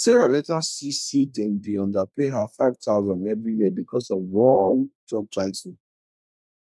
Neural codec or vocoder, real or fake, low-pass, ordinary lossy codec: codec, 24 kHz, 1 kbps, SNAC; fake; none; none